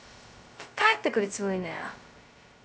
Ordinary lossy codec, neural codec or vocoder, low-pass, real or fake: none; codec, 16 kHz, 0.2 kbps, FocalCodec; none; fake